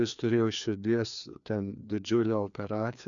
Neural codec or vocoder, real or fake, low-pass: codec, 16 kHz, 2 kbps, FreqCodec, larger model; fake; 7.2 kHz